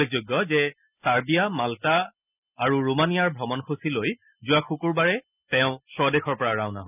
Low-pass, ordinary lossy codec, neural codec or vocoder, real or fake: 3.6 kHz; none; none; real